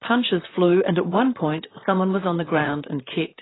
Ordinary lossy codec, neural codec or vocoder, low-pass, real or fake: AAC, 16 kbps; vocoder, 44.1 kHz, 128 mel bands, Pupu-Vocoder; 7.2 kHz; fake